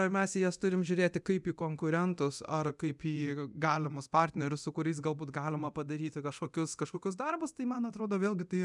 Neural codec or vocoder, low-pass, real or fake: codec, 24 kHz, 0.9 kbps, DualCodec; 10.8 kHz; fake